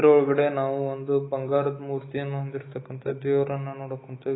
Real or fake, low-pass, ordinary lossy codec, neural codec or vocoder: fake; 7.2 kHz; AAC, 16 kbps; autoencoder, 48 kHz, 128 numbers a frame, DAC-VAE, trained on Japanese speech